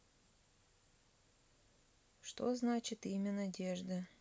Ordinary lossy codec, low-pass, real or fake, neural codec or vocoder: none; none; real; none